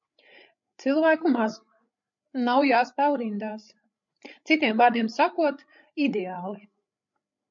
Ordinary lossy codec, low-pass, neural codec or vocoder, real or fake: MP3, 48 kbps; 7.2 kHz; codec, 16 kHz, 8 kbps, FreqCodec, larger model; fake